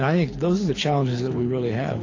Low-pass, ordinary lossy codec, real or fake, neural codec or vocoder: 7.2 kHz; AAC, 32 kbps; fake; codec, 24 kHz, 6 kbps, HILCodec